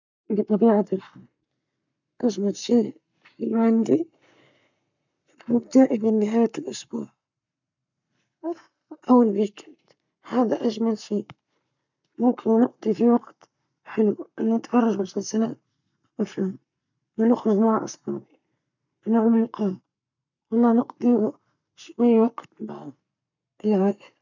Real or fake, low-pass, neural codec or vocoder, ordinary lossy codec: fake; 7.2 kHz; codec, 44.1 kHz, 7.8 kbps, Pupu-Codec; none